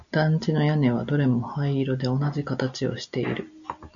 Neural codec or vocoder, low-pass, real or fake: none; 7.2 kHz; real